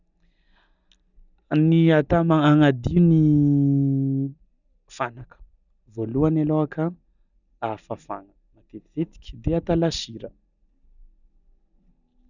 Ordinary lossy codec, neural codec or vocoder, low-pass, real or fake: none; none; 7.2 kHz; real